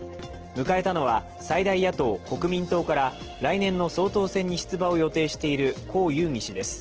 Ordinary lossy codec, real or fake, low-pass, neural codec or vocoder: Opus, 16 kbps; real; 7.2 kHz; none